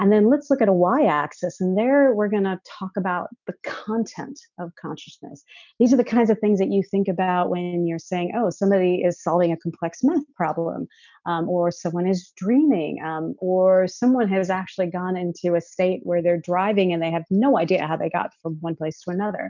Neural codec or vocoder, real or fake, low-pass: none; real; 7.2 kHz